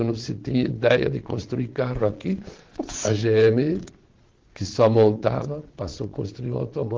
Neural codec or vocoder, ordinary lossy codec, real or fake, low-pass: vocoder, 22.05 kHz, 80 mel bands, Vocos; Opus, 16 kbps; fake; 7.2 kHz